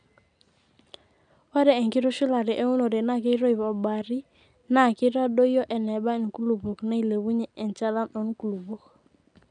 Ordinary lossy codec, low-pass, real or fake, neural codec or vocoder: none; 9.9 kHz; real; none